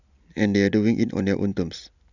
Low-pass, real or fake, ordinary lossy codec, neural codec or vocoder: 7.2 kHz; real; none; none